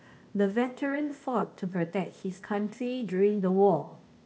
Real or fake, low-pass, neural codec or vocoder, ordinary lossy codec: fake; none; codec, 16 kHz, 0.8 kbps, ZipCodec; none